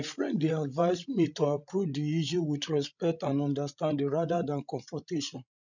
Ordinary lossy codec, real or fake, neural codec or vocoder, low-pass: none; fake; codec, 16 kHz, 16 kbps, FreqCodec, larger model; 7.2 kHz